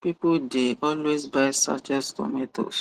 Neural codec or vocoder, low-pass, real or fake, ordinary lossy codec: none; 14.4 kHz; real; Opus, 16 kbps